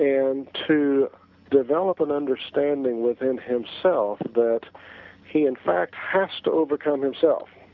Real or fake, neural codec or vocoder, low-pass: real; none; 7.2 kHz